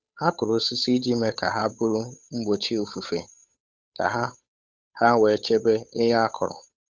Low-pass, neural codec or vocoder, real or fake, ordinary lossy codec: none; codec, 16 kHz, 8 kbps, FunCodec, trained on Chinese and English, 25 frames a second; fake; none